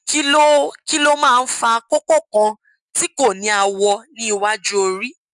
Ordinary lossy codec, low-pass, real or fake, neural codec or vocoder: none; 10.8 kHz; real; none